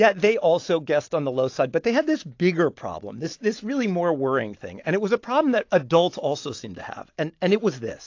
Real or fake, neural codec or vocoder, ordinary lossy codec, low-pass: real; none; AAC, 48 kbps; 7.2 kHz